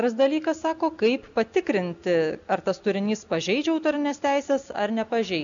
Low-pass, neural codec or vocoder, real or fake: 7.2 kHz; none; real